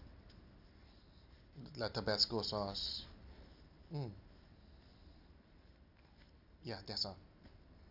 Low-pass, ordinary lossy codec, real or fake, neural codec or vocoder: 5.4 kHz; none; real; none